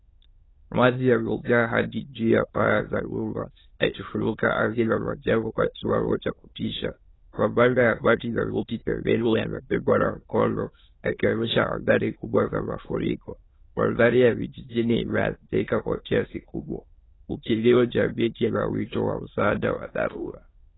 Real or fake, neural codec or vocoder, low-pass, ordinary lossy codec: fake; autoencoder, 22.05 kHz, a latent of 192 numbers a frame, VITS, trained on many speakers; 7.2 kHz; AAC, 16 kbps